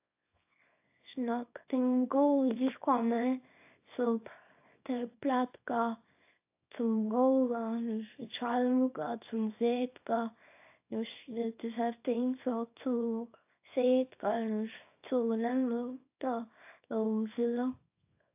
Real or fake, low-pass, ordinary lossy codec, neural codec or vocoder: fake; 3.6 kHz; AAC, 32 kbps; codec, 24 kHz, 0.9 kbps, WavTokenizer, small release